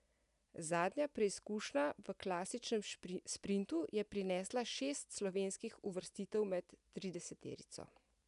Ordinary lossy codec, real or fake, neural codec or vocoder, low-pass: none; real; none; 10.8 kHz